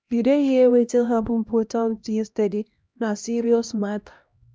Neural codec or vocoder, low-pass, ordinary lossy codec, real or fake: codec, 16 kHz, 1 kbps, X-Codec, HuBERT features, trained on LibriSpeech; none; none; fake